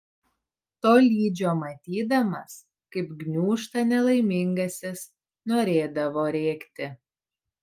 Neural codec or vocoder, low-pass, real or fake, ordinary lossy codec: none; 14.4 kHz; real; Opus, 32 kbps